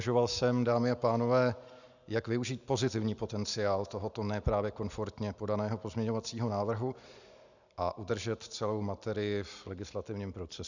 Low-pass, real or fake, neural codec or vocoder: 7.2 kHz; real; none